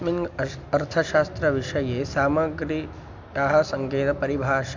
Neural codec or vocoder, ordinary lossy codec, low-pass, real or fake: none; MP3, 64 kbps; 7.2 kHz; real